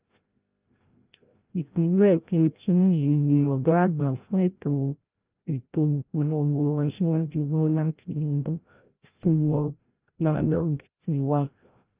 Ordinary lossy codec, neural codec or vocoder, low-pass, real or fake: Opus, 24 kbps; codec, 16 kHz, 0.5 kbps, FreqCodec, larger model; 3.6 kHz; fake